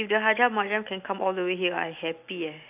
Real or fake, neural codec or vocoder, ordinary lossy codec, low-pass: real; none; AAC, 32 kbps; 3.6 kHz